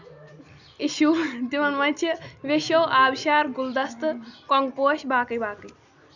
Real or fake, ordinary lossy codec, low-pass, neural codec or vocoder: real; none; 7.2 kHz; none